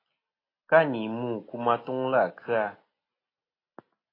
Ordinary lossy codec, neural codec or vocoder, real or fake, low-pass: AAC, 24 kbps; none; real; 5.4 kHz